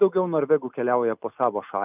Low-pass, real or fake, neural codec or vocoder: 3.6 kHz; real; none